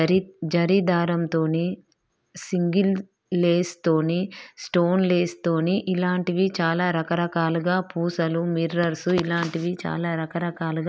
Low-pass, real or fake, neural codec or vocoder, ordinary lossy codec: none; real; none; none